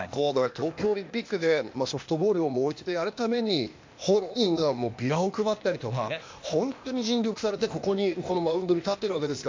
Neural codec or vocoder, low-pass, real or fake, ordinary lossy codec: codec, 16 kHz, 0.8 kbps, ZipCodec; 7.2 kHz; fake; MP3, 48 kbps